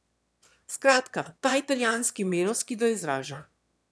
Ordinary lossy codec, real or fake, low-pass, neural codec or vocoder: none; fake; none; autoencoder, 22.05 kHz, a latent of 192 numbers a frame, VITS, trained on one speaker